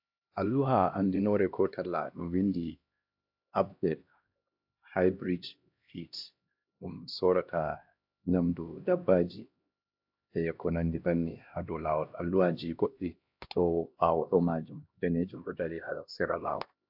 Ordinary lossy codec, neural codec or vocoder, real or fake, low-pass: AAC, 48 kbps; codec, 16 kHz, 1 kbps, X-Codec, HuBERT features, trained on LibriSpeech; fake; 5.4 kHz